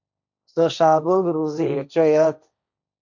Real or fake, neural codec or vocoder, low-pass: fake; codec, 16 kHz, 1.1 kbps, Voila-Tokenizer; 7.2 kHz